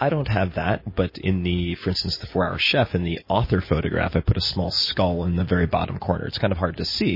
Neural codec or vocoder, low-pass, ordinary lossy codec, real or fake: none; 5.4 kHz; MP3, 24 kbps; real